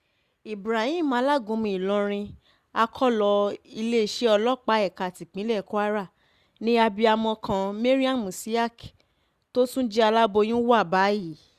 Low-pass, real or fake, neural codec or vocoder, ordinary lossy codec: 14.4 kHz; real; none; Opus, 64 kbps